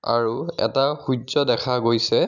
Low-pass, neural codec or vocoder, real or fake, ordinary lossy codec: 7.2 kHz; none; real; none